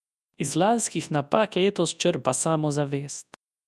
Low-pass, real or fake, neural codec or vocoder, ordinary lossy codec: none; fake; codec, 24 kHz, 0.9 kbps, WavTokenizer, large speech release; none